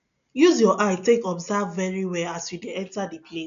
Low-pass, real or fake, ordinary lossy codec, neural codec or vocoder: 7.2 kHz; real; none; none